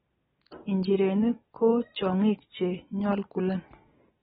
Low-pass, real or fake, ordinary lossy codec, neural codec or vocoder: 19.8 kHz; real; AAC, 16 kbps; none